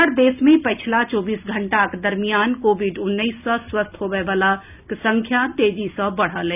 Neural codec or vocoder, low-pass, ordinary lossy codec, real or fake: none; 3.6 kHz; none; real